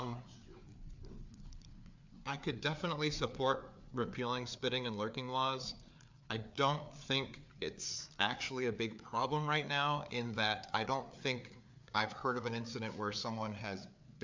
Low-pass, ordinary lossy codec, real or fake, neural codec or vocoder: 7.2 kHz; MP3, 64 kbps; fake; codec, 16 kHz, 4 kbps, FreqCodec, larger model